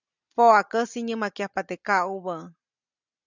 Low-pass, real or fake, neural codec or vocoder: 7.2 kHz; real; none